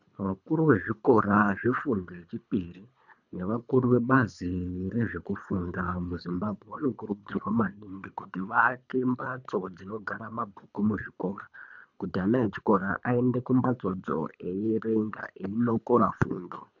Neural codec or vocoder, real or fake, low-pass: codec, 24 kHz, 3 kbps, HILCodec; fake; 7.2 kHz